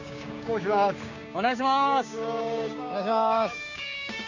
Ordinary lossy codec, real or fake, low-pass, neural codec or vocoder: Opus, 64 kbps; fake; 7.2 kHz; codec, 16 kHz, 6 kbps, DAC